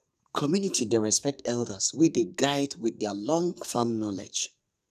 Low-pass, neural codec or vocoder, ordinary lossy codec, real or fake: 14.4 kHz; codec, 44.1 kHz, 2.6 kbps, SNAC; none; fake